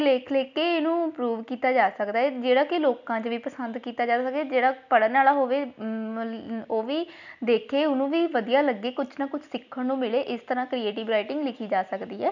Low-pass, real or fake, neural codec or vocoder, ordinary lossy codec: 7.2 kHz; real; none; none